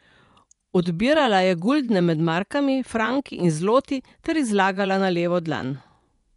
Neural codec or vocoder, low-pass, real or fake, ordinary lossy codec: vocoder, 24 kHz, 100 mel bands, Vocos; 10.8 kHz; fake; none